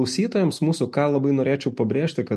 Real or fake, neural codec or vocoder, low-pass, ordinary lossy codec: real; none; 14.4 kHz; MP3, 64 kbps